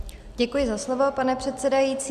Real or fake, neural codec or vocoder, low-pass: real; none; 14.4 kHz